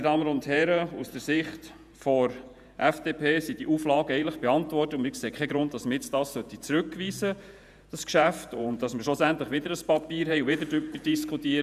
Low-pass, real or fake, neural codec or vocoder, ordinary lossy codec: 14.4 kHz; fake; vocoder, 48 kHz, 128 mel bands, Vocos; none